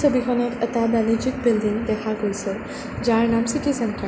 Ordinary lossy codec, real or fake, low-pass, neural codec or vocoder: none; real; none; none